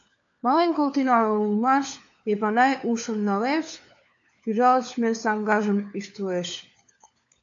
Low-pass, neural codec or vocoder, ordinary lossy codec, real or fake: 7.2 kHz; codec, 16 kHz, 4 kbps, FunCodec, trained on LibriTTS, 50 frames a second; AAC, 64 kbps; fake